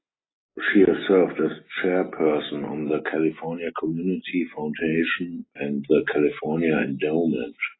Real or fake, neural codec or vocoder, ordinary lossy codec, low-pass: real; none; AAC, 16 kbps; 7.2 kHz